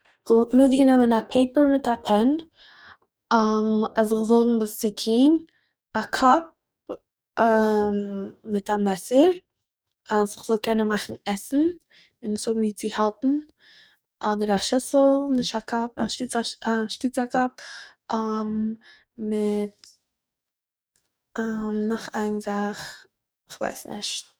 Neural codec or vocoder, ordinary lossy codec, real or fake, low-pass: codec, 44.1 kHz, 2.6 kbps, DAC; none; fake; none